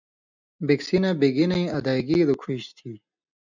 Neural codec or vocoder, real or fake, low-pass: none; real; 7.2 kHz